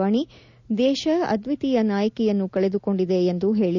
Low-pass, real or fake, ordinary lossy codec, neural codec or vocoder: 7.2 kHz; real; none; none